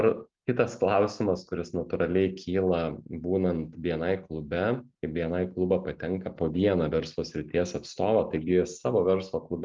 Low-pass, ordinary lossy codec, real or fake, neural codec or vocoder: 7.2 kHz; Opus, 32 kbps; real; none